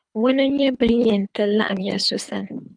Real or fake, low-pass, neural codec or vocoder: fake; 9.9 kHz; codec, 24 kHz, 3 kbps, HILCodec